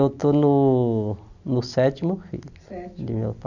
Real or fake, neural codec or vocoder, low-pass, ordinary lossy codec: real; none; 7.2 kHz; none